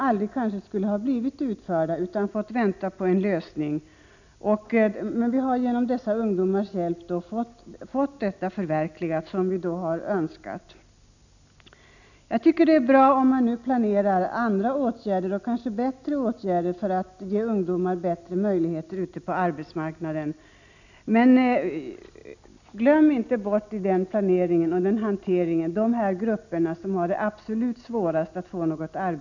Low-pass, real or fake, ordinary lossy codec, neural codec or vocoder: 7.2 kHz; real; none; none